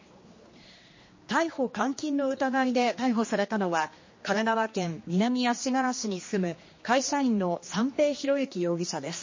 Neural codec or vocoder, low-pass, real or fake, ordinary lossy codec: codec, 16 kHz, 2 kbps, X-Codec, HuBERT features, trained on general audio; 7.2 kHz; fake; MP3, 32 kbps